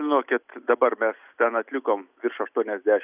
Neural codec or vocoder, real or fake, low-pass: none; real; 3.6 kHz